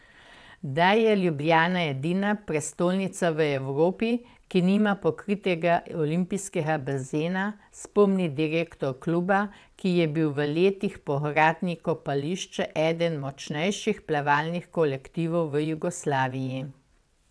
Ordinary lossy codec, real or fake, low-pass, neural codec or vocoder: none; fake; none; vocoder, 22.05 kHz, 80 mel bands, WaveNeXt